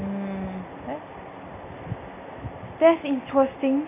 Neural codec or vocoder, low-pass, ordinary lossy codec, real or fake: none; 3.6 kHz; none; real